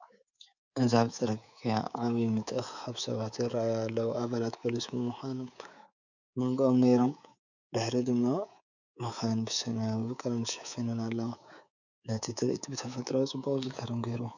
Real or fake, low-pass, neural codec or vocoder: fake; 7.2 kHz; codec, 24 kHz, 3.1 kbps, DualCodec